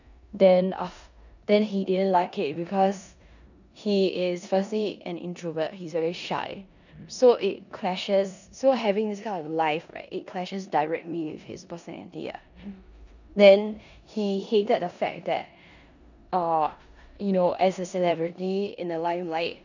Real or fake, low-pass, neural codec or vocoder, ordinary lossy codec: fake; 7.2 kHz; codec, 16 kHz in and 24 kHz out, 0.9 kbps, LongCat-Audio-Codec, four codebook decoder; none